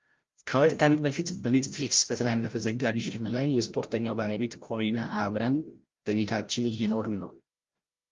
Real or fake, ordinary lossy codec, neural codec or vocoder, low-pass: fake; Opus, 32 kbps; codec, 16 kHz, 0.5 kbps, FreqCodec, larger model; 7.2 kHz